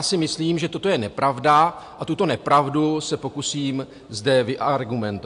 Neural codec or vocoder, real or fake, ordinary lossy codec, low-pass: none; real; AAC, 64 kbps; 10.8 kHz